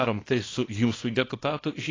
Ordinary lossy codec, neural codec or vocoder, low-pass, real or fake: AAC, 32 kbps; codec, 24 kHz, 0.9 kbps, WavTokenizer, small release; 7.2 kHz; fake